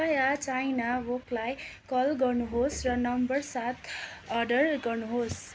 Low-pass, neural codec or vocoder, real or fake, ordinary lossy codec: none; none; real; none